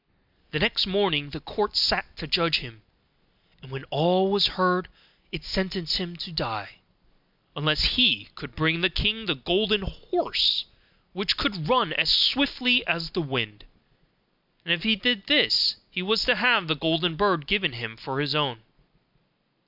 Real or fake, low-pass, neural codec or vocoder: real; 5.4 kHz; none